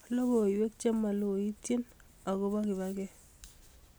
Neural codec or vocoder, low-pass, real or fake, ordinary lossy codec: none; none; real; none